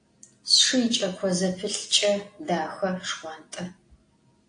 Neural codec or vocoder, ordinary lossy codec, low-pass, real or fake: none; AAC, 48 kbps; 9.9 kHz; real